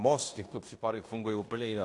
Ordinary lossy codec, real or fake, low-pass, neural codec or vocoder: AAC, 48 kbps; fake; 10.8 kHz; codec, 16 kHz in and 24 kHz out, 0.9 kbps, LongCat-Audio-Codec, fine tuned four codebook decoder